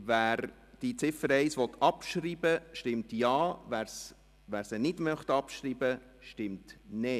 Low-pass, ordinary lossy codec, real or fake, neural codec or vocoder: 14.4 kHz; none; real; none